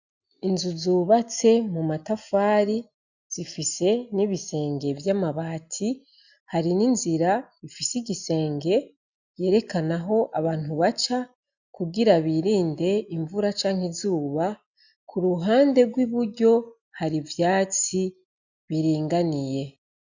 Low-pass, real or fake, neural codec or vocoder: 7.2 kHz; real; none